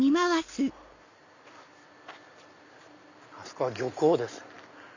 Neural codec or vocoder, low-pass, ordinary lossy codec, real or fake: none; 7.2 kHz; none; real